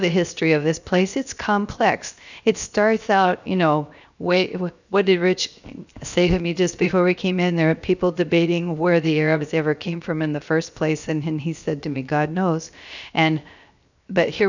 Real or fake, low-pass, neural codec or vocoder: fake; 7.2 kHz; codec, 16 kHz, 0.7 kbps, FocalCodec